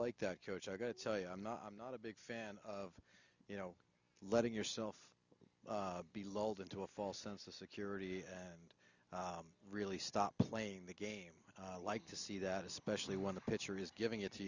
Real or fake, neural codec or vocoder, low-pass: real; none; 7.2 kHz